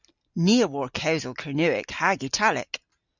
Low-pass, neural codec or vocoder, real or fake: 7.2 kHz; none; real